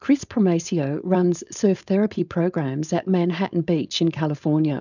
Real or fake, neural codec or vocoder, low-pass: fake; codec, 16 kHz, 4.8 kbps, FACodec; 7.2 kHz